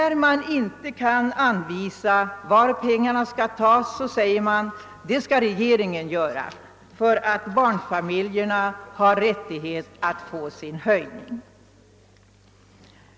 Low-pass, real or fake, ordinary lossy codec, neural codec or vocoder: none; real; none; none